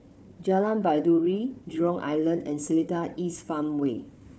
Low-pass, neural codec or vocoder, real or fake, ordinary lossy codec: none; codec, 16 kHz, 4 kbps, FunCodec, trained on Chinese and English, 50 frames a second; fake; none